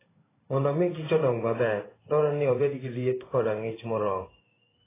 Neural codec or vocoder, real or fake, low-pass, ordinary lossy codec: codec, 16 kHz in and 24 kHz out, 1 kbps, XY-Tokenizer; fake; 3.6 kHz; AAC, 16 kbps